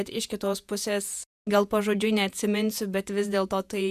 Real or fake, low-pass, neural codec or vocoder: fake; 14.4 kHz; vocoder, 48 kHz, 128 mel bands, Vocos